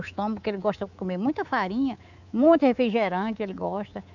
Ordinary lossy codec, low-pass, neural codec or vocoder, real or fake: none; 7.2 kHz; codec, 24 kHz, 3.1 kbps, DualCodec; fake